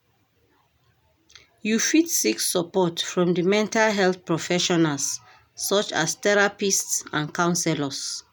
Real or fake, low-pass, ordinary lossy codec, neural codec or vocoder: real; none; none; none